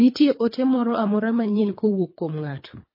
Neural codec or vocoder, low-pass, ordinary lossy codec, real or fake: codec, 24 kHz, 3 kbps, HILCodec; 5.4 kHz; MP3, 24 kbps; fake